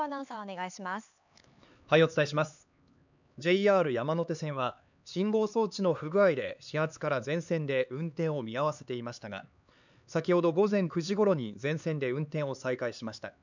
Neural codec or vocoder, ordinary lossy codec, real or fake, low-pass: codec, 16 kHz, 4 kbps, X-Codec, HuBERT features, trained on LibriSpeech; none; fake; 7.2 kHz